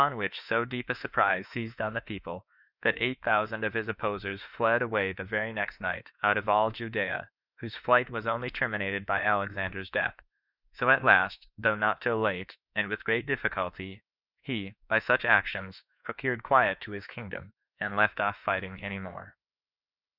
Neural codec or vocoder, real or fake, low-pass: autoencoder, 48 kHz, 32 numbers a frame, DAC-VAE, trained on Japanese speech; fake; 5.4 kHz